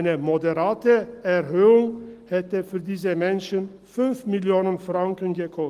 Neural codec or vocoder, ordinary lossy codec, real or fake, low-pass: none; Opus, 24 kbps; real; 14.4 kHz